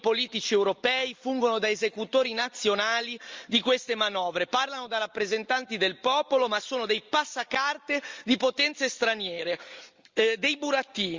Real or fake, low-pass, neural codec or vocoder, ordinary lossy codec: real; 7.2 kHz; none; Opus, 32 kbps